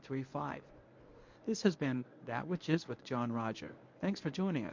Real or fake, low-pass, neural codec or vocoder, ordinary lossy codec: fake; 7.2 kHz; codec, 24 kHz, 0.9 kbps, WavTokenizer, medium speech release version 1; MP3, 48 kbps